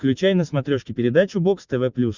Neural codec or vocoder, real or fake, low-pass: none; real; 7.2 kHz